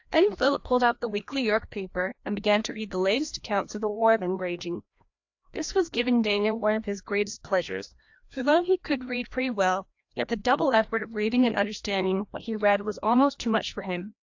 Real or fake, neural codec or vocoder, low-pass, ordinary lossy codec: fake; codec, 16 kHz, 1 kbps, FreqCodec, larger model; 7.2 kHz; AAC, 48 kbps